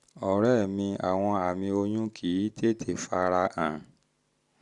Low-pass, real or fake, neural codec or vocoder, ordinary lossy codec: 10.8 kHz; real; none; Opus, 64 kbps